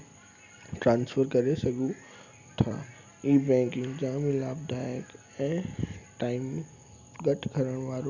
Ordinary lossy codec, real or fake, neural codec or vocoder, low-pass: none; real; none; 7.2 kHz